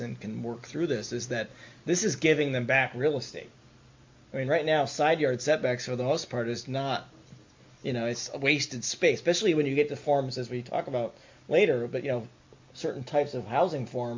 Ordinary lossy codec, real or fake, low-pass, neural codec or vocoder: MP3, 48 kbps; real; 7.2 kHz; none